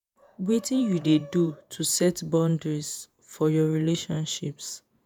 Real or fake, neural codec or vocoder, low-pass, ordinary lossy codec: fake; vocoder, 48 kHz, 128 mel bands, Vocos; none; none